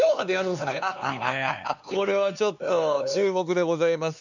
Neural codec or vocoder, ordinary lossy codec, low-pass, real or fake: codec, 16 kHz, 4 kbps, X-Codec, HuBERT features, trained on LibriSpeech; none; 7.2 kHz; fake